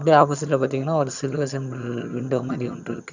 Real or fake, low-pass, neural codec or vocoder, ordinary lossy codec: fake; 7.2 kHz; vocoder, 22.05 kHz, 80 mel bands, HiFi-GAN; none